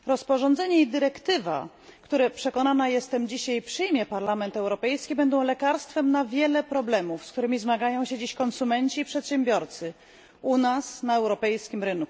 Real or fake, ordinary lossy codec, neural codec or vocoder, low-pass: real; none; none; none